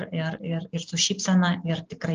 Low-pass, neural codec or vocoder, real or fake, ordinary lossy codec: 7.2 kHz; none; real; Opus, 24 kbps